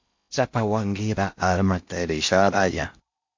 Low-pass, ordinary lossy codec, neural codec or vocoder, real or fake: 7.2 kHz; MP3, 48 kbps; codec, 16 kHz in and 24 kHz out, 0.6 kbps, FocalCodec, streaming, 4096 codes; fake